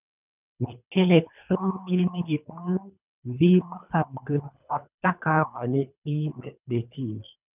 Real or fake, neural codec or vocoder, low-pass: fake; codec, 24 kHz, 3 kbps, HILCodec; 3.6 kHz